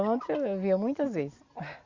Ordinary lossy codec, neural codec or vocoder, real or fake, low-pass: Opus, 64 kbps; none; real; 7.2 kHz